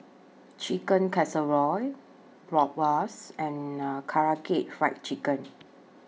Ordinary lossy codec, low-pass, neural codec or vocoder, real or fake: none; none; none; real